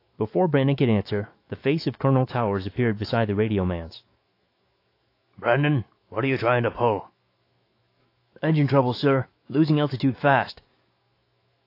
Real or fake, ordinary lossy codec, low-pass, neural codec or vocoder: fake; AAC, 32 kbps; 5.4 kHz; autoencoder, 48 kHz, 128 numbers a frame, DAC-VAE, trained on Japanese speech